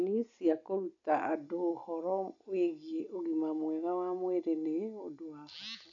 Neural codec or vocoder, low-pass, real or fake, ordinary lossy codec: none; 7.2 kHz; real; none